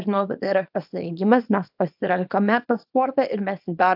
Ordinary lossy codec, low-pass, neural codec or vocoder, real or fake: AAC, 48 kbps; 5.4 kHz; codec, 24 kHz, 0.9 kbps, WavTokenizer, small release; fake